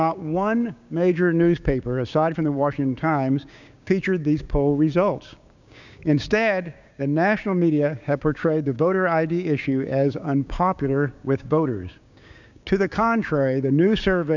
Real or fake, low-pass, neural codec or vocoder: fake; 7.2 kHz; codec, 16 kHz, 6 kbps, DAC